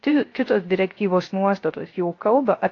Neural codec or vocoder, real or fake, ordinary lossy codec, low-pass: codec, 16 kHz, 0.3 kbps, FocalCodec; fake; AAC, 32 kbps; 7.2 kHz